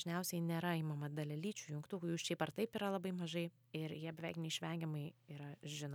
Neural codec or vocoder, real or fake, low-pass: none; real; 19.8 kHz